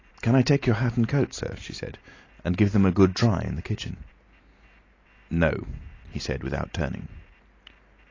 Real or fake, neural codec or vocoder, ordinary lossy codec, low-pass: real; none; AAC, 32 kbps; 7.2 kHz